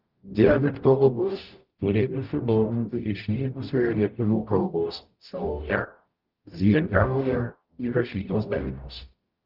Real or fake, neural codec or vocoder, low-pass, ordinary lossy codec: fake; codec, 44.1 kHz, 0.9 kbps, DAC; 5.4 kHz; Opus, 32 kbps